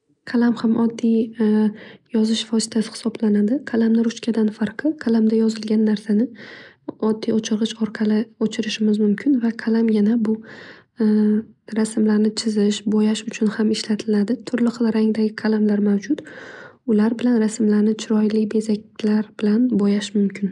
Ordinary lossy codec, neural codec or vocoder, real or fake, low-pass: none; none; real; 10.8 kHz